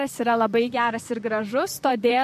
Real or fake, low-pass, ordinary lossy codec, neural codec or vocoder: fake; 14.4 kHz; MP3, 64 kbps; vocoder, 44.1 kHz, 128 mel bands, Pupu-Vocoder